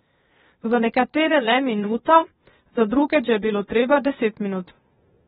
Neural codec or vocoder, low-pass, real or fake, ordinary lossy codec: codec, 16 kHz, 0.8 kbps, ZipCodec; 7.2 kHz; fake; AAC, 16 kbps